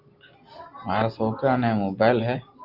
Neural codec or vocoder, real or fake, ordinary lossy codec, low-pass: none; real; Opus, 24 kbps; 5.4 kHz